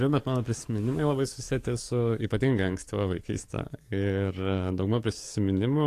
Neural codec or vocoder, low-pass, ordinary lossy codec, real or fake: codec, 44.1 kHz, 7.8 kbps, DAC; 14.4 kHz; AAC, 64 kbps; fake